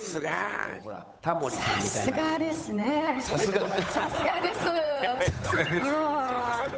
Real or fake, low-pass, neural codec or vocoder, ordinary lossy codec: fake; none; codec, 16 kHz, 8 kbps, FunCodec, trained on Chinese and English, 25 frames a second; none